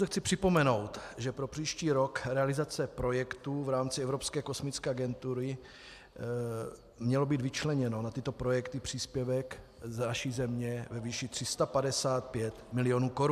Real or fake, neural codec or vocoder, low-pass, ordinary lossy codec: real; none; 14.4 kHz; AAC, 96 kbps